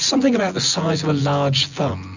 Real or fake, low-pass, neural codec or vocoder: fake; 7.2 kHz; vocoder, 24 kHz, 100 mel bands, Vocos